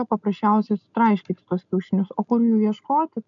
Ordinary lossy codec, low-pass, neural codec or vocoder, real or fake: MP3, 96 kbps; 7.2 kHz; none; real